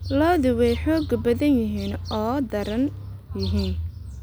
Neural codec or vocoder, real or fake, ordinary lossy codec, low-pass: none; real; none; none